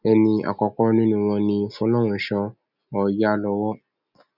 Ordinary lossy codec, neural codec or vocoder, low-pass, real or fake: none; none; 5.4 kHz; real